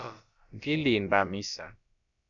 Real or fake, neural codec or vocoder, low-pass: fake; codec, 16 kHz, about 1 kbps, DyCAST, with the encoder's durations; 7.2 kHz